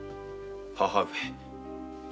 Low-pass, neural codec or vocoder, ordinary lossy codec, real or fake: none; none; none; real